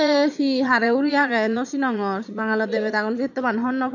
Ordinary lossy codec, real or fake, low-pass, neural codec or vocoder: none; fake; 7.2 kHz; vocoder, 22.05 kHz, 80 mel bands, Vocos